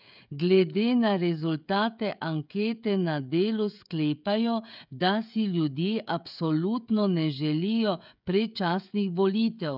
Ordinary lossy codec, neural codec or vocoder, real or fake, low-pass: none; codec, 16 kHz, 16 kbps, FreqCodec, smaller model; fake; 5.4 kHz